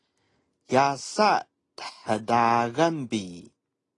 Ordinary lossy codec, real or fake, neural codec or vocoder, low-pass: AAC, 32 kbps; real; none; 10.8 kHz